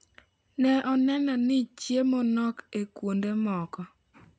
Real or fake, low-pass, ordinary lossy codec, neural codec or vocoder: real; none; none; none